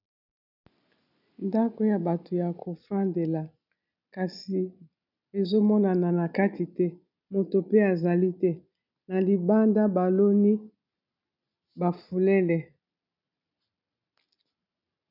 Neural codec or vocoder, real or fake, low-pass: none; real; 5.4 kHz